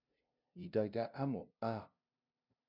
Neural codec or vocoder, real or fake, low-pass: codec, 16 kHz, 0.5 kbps, FunCodec, trained on LibriTTS, 25 frames a second; fake; 5.4 kHz